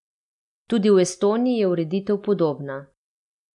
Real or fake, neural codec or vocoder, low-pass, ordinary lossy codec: real; none; 10.8 kHz; none